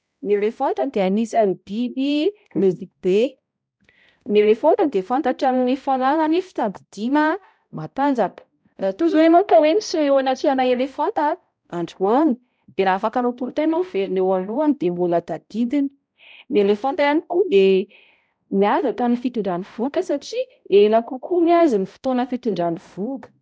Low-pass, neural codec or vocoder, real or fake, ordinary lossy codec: none; codec, 16 kHz, 0.5 kbps, X-Codec, HuBERT features, trained on balanced general audio; fake; none